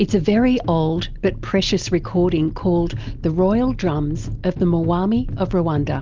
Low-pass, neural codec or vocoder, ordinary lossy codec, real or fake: 7.2 kHz; none; Opus, 32 kbps; real